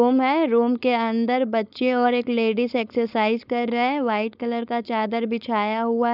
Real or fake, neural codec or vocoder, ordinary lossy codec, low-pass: fake; codec, 16 kHz, 4.8 kbps, FACodec; none; 5.4 kHz